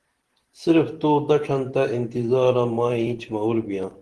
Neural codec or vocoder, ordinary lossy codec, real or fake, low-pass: vocoder, 44.1 kHz, 128 mel bands every 512 samples, BigVGAN v2; Opus, 16 kbps; fake; 10.8 kHz